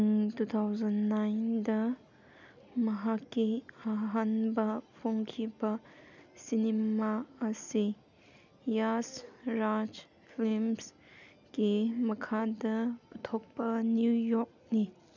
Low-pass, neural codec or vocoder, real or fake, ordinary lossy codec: 7.2 kHz; none; real; none